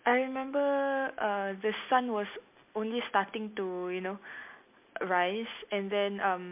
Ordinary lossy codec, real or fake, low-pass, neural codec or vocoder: MP3, 32 kbps; real; 3.6 kHz; none